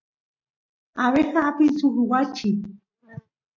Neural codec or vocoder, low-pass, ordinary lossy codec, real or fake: none; 7.2 kHz; AAC, 48 kbps; real